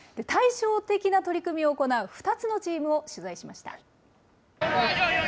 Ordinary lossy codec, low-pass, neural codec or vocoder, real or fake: none; none; none; real